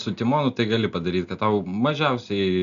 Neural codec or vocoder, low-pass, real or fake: none; 7.2 kHz; real